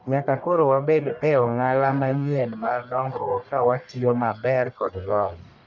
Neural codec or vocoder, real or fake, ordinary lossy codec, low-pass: codec, 44.1 kHz, 1.7 kbps, Pupu-Codec; fake; none; 7.2 kHz